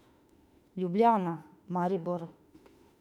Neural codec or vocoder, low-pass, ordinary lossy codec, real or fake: autoencoder, 48 kHz, 32 numbers a frame, DAC-VAE, trained on Japanese speech; 19.8 kHz; none; fake